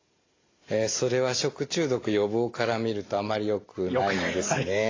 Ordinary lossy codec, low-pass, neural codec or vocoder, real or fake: AAC, 32 kbps; 7.2 kHz; none; real